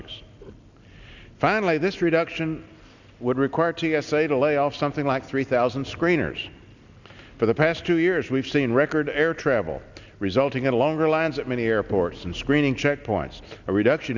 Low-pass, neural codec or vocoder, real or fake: 7.2 kHz; none; real